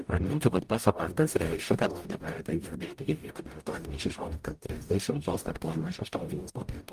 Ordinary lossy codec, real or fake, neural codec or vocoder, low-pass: Opus, 32 kbps; fake; codec, 44.1 kHz, 0.9 kbps, DAC; 14.4 kHz